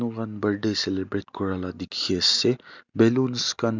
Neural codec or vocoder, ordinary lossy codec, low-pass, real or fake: none; none; 7.2 kHz; real